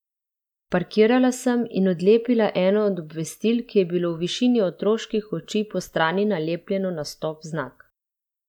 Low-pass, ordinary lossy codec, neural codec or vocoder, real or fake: 19.8 kHz; none; none; real